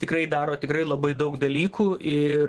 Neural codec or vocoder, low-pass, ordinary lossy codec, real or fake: vocoder, 24 kHz, 100 mel bands, Vocos; 10.8 kHz; Opus, 16 kbps; fake